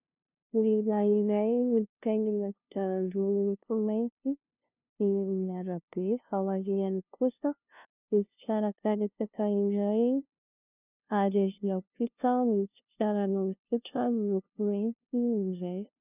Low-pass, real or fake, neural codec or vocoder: 3.6 kHz; fake; codec, 16 kHz, 0.5 kbps, FunCodec, trained on LibriTTS, 25 frames a second